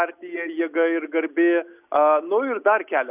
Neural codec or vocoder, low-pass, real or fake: none; 3.6 kHz; real